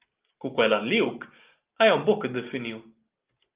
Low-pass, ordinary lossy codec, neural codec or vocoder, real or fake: 3.6 kHz; Opus, 32 kbps; none; real